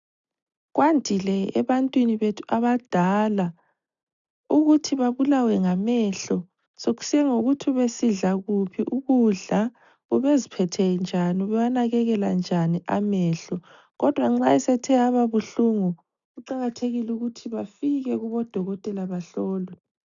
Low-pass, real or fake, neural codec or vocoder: 7.2 kHz; real; none